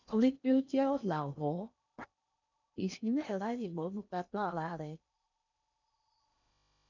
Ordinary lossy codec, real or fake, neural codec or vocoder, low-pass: none; fake; codec, 16 kHz in and 24 kHz out, 0.8 kbps, FocalCodec, streaming, 65536 codes; 7.2 kHz